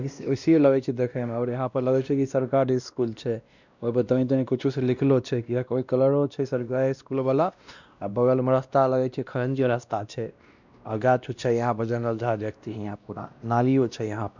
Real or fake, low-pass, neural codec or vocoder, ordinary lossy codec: fake; 7.2 kHz; codec, 16 kHz, 1 kbps, X-Codec, WavLM features, trained on Multilingual LibriSpeech; none